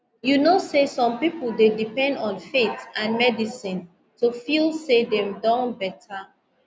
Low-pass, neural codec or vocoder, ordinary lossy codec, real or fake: none; none; none; real